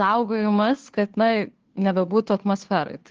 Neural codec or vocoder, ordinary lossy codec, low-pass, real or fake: codec, 16 kHz, 2 kbps, FunCodec, trained on Chinese and English, 25 frames a second; Opus, 16 kbps; 7.2 kHz; fake